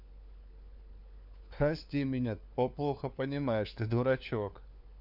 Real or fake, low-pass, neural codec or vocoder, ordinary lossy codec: fake; 5.4 kHz; codec, 16 kHz, 4 kbps, FunCodec, trained on LibriTTS, 50 frames a second; none